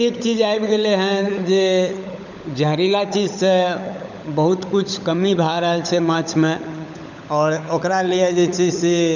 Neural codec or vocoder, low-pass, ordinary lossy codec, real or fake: codec, 16 kHz, 16 kbps, FunCodec, trained on LibriTTS, 50 frames a second; 7.2 kHz; none; fake